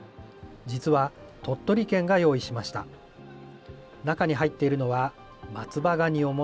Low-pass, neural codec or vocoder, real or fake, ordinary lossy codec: none; none; real; none